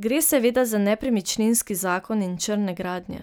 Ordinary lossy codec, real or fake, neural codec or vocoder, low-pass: none; real; none; none